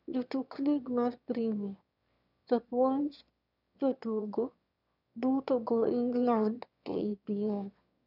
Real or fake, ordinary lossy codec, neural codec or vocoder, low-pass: fake; none; autoencoder, 22.05 kHz, a latent of 192 numbers a frame, VITS, trained on one speaker; 5.4 kHz